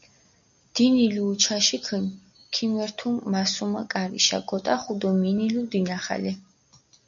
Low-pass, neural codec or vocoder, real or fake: 7.2 kHz; none; real